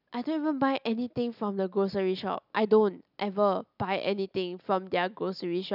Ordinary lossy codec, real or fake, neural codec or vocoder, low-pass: none; real; none; 5.4 kHz